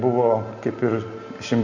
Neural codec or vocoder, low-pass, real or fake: none; 7.2 kHz; real